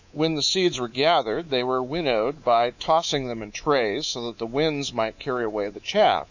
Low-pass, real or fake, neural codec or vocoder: 7.2 kHz; fake; codec, 24 kHz, 3.1 kbps, DualCodec